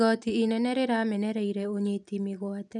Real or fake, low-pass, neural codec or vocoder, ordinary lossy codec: real; 10.8 kHz; none; none